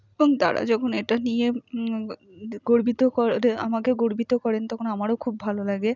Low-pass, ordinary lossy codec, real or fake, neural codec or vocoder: 7.2 kHz; none; real; none